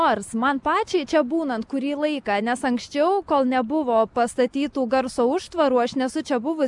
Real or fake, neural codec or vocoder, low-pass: real; none; 10.8 kHz